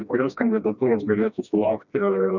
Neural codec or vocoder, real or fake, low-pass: codec, 16 kHz, 1 kbps, FreqCodec, smaller model; fake; 7.2 kHz